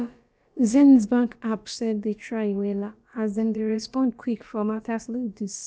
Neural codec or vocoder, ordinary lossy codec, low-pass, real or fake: codec, 16 kHz, about 1 kbps, DyCAST, with the encoder's durations; none; none; fake